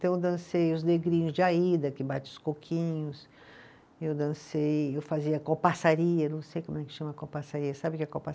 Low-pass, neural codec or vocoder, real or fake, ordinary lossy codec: none; none; real; none